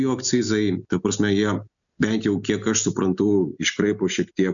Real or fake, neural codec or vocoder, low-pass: real; none; 7.2 kHz